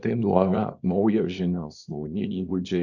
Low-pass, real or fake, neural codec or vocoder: 7.2 kHz; fake; codec, 24 kHz, 0.9 kbps, WavTokenizer, small release